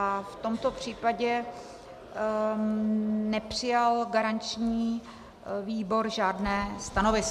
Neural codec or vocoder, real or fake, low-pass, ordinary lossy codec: none; real; 14.4 kHz; MP3, 96 kbps